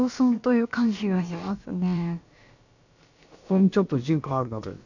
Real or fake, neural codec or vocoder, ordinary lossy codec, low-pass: fake; codec, 16 kHz, about 1 kbps, DyCAST, with the encoder's durations; none; 7.2 kHz